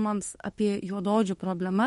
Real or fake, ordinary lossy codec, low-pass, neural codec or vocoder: fake; MP3, 48 kbps; 19.8 kHz; autoencoder, 48 kHz, 32 numbers a frame, DAC-VAE, trained on Japanese speech